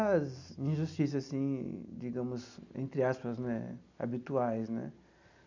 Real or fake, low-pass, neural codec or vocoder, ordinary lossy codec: real; 7.2 kHz; none; none